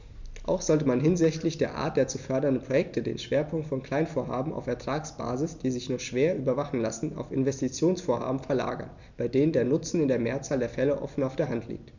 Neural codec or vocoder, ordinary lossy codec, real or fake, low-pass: none; none; real; 7.2 kHz